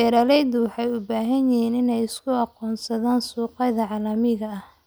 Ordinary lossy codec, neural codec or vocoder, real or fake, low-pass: none; none; real; none